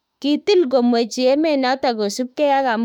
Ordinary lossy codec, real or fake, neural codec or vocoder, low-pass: none; fake; autoencoder, 48 kHz, 32 numbers a frame, DAC-VAE, trained on Japanese speech; 19.8 kHz